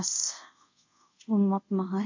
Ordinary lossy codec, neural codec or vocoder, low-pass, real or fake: MP3, 48 kbps; codec, 24 kHz, 0.5 kbps, DualCodec; 7.2 kHz; fake